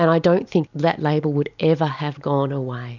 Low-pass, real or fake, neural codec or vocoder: 7.2 kHz; real; none